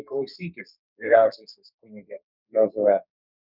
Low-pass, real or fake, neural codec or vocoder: 5.4 kHz; fake; codec, 32 kHz, 1.9 kbps, SNAC